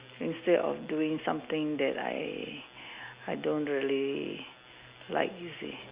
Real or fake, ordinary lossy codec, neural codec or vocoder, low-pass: real; Opus, 64 kbps; none; 3.6 kHz